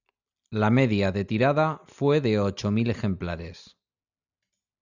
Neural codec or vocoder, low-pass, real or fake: none; 7.2 kHz; real